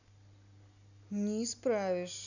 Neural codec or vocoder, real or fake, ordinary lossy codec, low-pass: none; real; none; 7.2 kHz